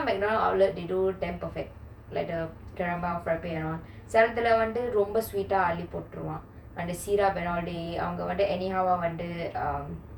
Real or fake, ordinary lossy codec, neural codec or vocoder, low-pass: fake; none; vocoder, 48 kHz, 128 mel bands, Vocos; 19.8 kHz